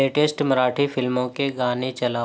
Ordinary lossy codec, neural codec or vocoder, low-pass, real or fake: none; none; none; real